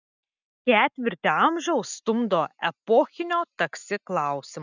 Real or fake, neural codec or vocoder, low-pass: real; none; 7.2 kHz